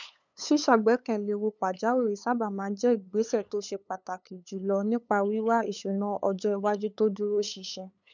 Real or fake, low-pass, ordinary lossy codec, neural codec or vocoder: fake; 7.2 kHz; none; codec, 16 kHz, 8 kbps, FunCodec, trained on LibriTTS, 25 frames a second